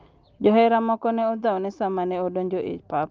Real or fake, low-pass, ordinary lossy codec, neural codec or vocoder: real; 7.2 kHz; Opus, 24 kbps; none